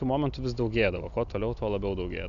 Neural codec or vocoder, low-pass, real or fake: none; 7.2 kHz; real